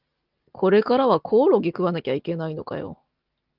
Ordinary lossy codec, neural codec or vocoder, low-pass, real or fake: Opus, 32 kbps; none; 5.4 kHz; real